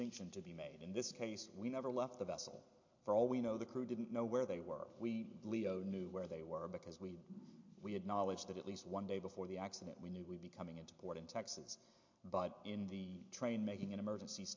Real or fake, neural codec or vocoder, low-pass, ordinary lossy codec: real; none; 7.2 kHz; MP3, 48 kbps